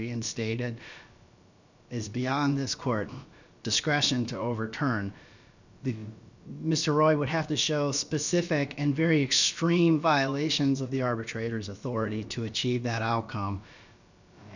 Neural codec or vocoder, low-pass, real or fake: codec, 16 kHz, about 1 kbps, DyCAST, with the encoder's durations; 7.2 kHz; fake